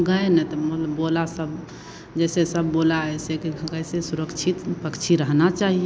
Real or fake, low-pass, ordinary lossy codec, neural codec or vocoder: real; none; none; none